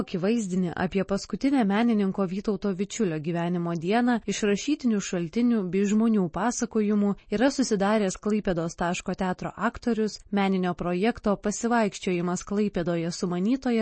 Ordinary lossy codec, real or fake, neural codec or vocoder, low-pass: MP3, 32 kbps; real; none; 9.9 kHz